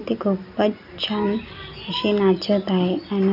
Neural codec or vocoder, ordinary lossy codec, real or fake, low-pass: none; none; real; 5.4 kHz